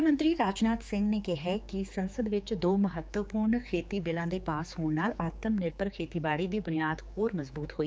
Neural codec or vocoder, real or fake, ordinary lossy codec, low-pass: codec, 16 kHz, 4 kbps, X-Codec, HuBERT features, trained on general audio; fake; none; none